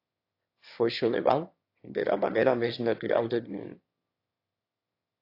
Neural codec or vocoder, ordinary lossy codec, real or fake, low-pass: autoencoder, 22.05 kHz, a latent of 192 numbers a frame, VITS, trained on one speaker; AAC, 32 kbps; fake; 5.4 kHz